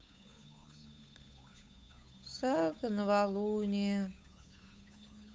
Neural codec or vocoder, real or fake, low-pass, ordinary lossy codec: codec, 16 kHz, 8 kbps, FunCodec, trained on Chinese and English, 25 frames a second; fake; none; none